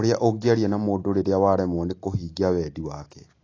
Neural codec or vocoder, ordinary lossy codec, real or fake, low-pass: none; AAC, 32 kbps; real; 7.2 kHz